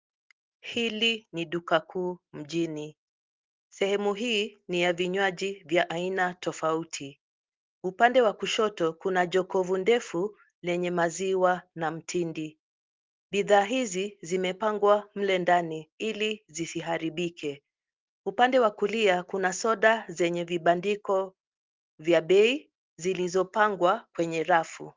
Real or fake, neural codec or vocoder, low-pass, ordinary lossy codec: real; none; 7.2 kHz; Opus, 32 kbps